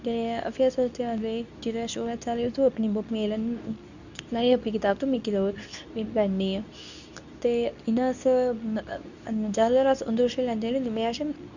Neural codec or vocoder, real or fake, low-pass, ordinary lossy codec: codec, 24 kHz, 0.9 kbps, WavTokenizer, medium speech release version 2; fake; 7.2 kHz; none